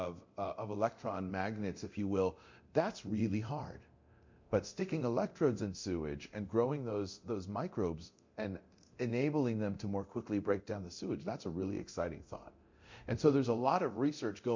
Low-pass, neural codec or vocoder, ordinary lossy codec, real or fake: 7.2 kHz; codec, 24 kHz, 0.9 kbps, DualCodec; MP3, 48 kbps; fake